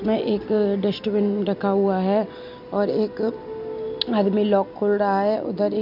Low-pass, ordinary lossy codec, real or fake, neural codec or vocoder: 5.4 kHz; none; real; none